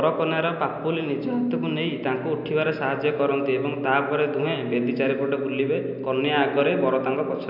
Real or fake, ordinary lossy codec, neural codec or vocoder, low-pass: real; none; none; 5.4 kHz